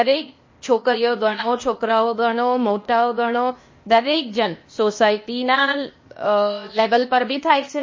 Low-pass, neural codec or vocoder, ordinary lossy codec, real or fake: 7.2 kHz; codec, 16 kHz, 0.8 kbps, ZipCodec; MP3, 32 kbps; fake